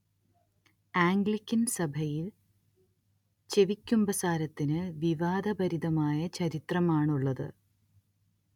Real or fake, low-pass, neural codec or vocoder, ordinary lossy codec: real; 19.8 kHz; none; none